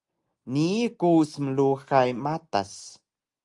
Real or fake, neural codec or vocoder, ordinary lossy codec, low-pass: real; none; Opus, 32 kbps; 10.8 kHz